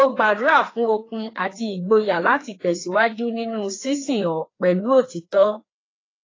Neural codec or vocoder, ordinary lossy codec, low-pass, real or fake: codec, 16 kHz, 2 kbps, FreqCodec, larger model; AAC, 32 kbps; 7.2 kHz; fake